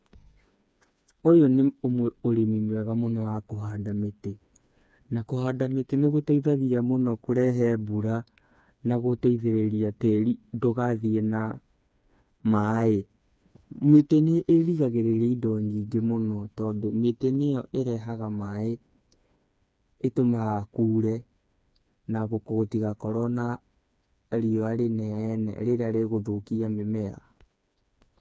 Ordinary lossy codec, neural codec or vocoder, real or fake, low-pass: none; codec, 16 kHz, 4 kbps, FreqCodec, smaller model; fake; none